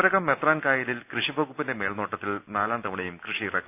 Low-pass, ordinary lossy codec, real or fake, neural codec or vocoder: 3.6 kHz; none; real; none